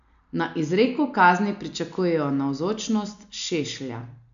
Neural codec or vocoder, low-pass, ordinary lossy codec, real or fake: none; 7.2 kHz; AAC, 96 kbps; real